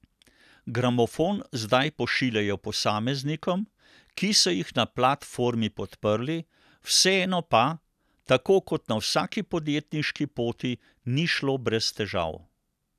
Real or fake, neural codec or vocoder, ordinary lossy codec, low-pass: real; none; none; 14.4 kHz